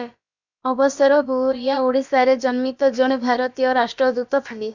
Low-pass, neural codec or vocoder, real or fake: 7.2 kHz; codec, 16 kHz, about 1 kbps, DyCAST, with the encoder's durations; fake